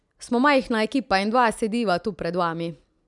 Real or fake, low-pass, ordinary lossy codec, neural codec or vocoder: real; 10.8 kHz; none; none